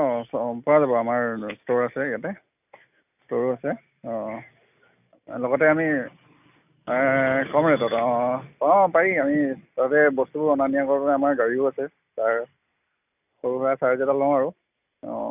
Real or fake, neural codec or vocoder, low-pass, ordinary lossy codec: real; none; 3.6 kHz; none